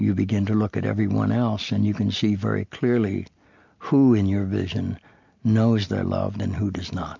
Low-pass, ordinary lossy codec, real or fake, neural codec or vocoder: 7.2 kHz; MP3, 48 kbps; real; none